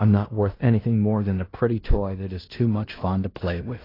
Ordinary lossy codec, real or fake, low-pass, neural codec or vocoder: AAC, 24 kbps; fake; 5.4 kHz; codec, 16 kHz in and 24 kHz out, 0.9 kbps, LongCat-Audio-Codec, fine tuned four codebook decoder